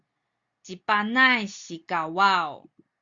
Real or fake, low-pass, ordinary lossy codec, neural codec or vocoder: real; 7.2 kHz; Opus, 64 kbps; none